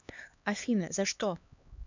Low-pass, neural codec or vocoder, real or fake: 7.2 kHz; codec, 16 kHz, 2 kbps, X-Codec, HuBERT features, trained on LibriSpeech; fake